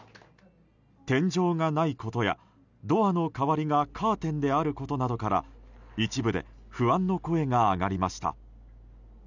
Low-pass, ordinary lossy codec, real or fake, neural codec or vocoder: 7.2 kHz; none; real; none